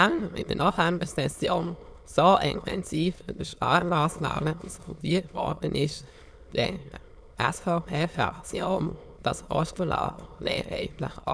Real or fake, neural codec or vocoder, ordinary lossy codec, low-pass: fake; autoencoder, 22.05 kHz, a latent of 192 numbers a frame, VITS, trained on many speakers; none; none